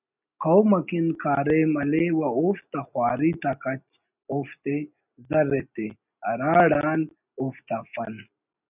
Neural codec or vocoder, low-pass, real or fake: none; 3.6 kHz; real